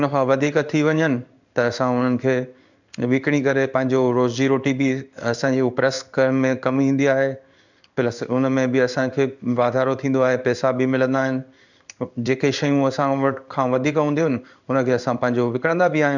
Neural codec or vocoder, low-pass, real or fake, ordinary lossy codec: codec, 16 kHz in and 24 kHz out, 1 kbps, XY-Tokenizer; 7.2 kHz; fake; none